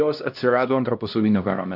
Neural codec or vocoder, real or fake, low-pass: codec, 16 kHz, 1 kbps, X-Codec, HuBERT features, trained on LibriSpeech; fake; 5.4 kHz